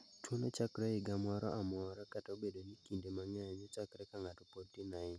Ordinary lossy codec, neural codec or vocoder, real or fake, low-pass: none; none; real; none